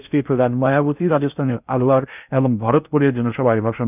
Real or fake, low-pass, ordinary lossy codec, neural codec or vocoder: fake; 3.6 kHz; none; codec, 16 kHz in and 24 kHz out, 0.6 kbps, FocalCodec, streaming, 2048 codes